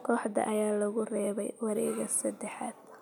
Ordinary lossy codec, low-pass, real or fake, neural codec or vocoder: none; none; real; none